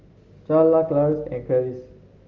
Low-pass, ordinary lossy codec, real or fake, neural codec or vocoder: 7.2 kHz; Opus, 32 kbps; real; none